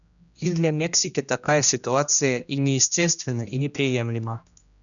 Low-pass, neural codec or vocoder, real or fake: 7.2 kHz; codec, 16 kHz, 1 kbps, X-Codec, HuBERT features, trained on general audio; fake